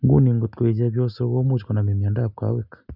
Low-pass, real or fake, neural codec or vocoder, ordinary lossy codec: 5.4 kHz; real; none; none